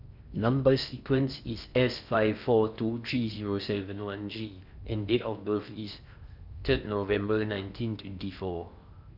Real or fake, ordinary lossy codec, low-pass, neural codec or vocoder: fake; none; 5.4 kHz; codec, 16 kHz in and 24 kHz out, 0.6 kbps, FocalCodec, streaming, 4096 codes